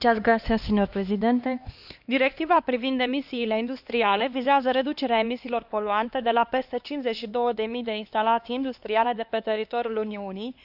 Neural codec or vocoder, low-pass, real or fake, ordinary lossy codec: codec, 16 kHz, 2 kbps, X-Codec, HuBERT features, trained on LibriSpeech; 5.4 kHz; fake; none